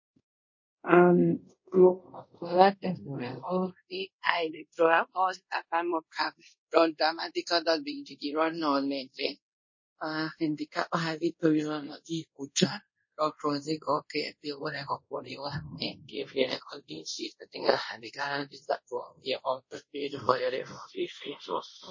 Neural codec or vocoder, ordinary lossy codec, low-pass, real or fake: codec, 24 kHz, 0.5 kbps, DualCodec; MP3, 32 kbps; 7.2 kHz; fake